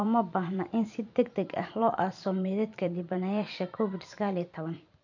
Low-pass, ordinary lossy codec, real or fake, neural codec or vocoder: 7.2 kHz; none; fake; vocoder, 44.1 kHz, 128 mel bands every 512 samples, BigVGAN v2